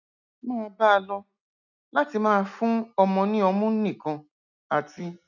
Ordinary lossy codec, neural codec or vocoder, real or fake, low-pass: none; none; real; 7.2 kHz